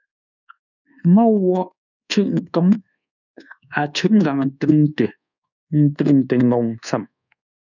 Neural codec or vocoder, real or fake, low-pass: codec, 24 kHz, 1.2 kbps, DualCodec; fake; 7.2 kHz